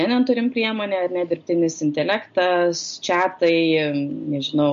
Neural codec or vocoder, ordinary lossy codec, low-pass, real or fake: none; MP3, 48 kbps; 7.2 kHz; real